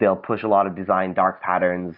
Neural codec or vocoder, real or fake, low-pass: none; real; 5.4 kHz